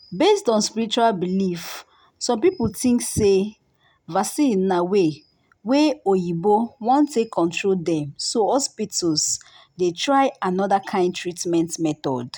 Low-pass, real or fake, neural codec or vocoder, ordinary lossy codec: none; real; none; none